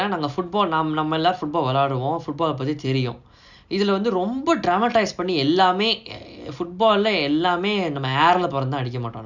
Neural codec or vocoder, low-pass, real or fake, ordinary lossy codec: none; 7.2 kHz; real; none